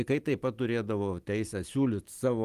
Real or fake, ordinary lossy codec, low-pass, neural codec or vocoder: real; Opus, 24 kbps; 14.4 kHz; none